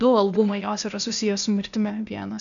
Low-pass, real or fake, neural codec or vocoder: 7.2 kHz; fake; codec, 16 kHz, 0.8 kbps, ZipCodec